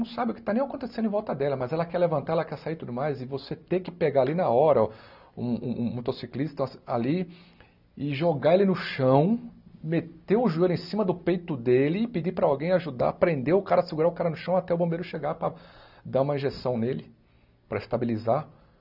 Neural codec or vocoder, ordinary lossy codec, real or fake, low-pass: none; none; real; 5.4 kHz